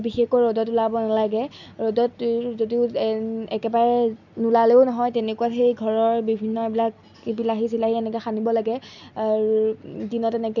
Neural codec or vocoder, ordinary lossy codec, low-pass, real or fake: none; none; 7.2 kHz; real